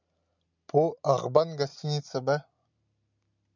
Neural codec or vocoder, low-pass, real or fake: none; 7.2 kHz; real